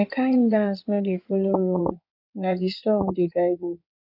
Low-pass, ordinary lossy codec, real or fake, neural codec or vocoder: 5.4 kHz; none; fake; codec, 16 kHz in and 24 kHz out, 2.2 kbps, FireRedTTS-2 codec